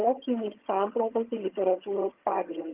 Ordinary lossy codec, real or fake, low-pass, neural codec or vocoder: Opus, 32 kbps; fake; 3.6 kHz; vocoder, 22.05 kHz, 80 mel bands, HiFi-GAN